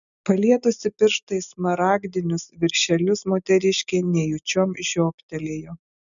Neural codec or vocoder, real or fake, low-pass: none; real; 7.2 kHz